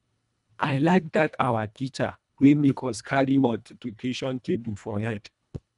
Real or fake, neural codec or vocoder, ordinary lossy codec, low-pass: fake; codec, 24 kHz, 1.5 kbps, HILCodec; none; 10.8 kHz